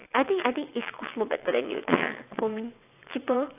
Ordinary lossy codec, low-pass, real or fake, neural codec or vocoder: AAC, 24 kbps; 3.6 kHz; fake; vocoder, 22.05 kHz, 80 mel bands, WaveNeXt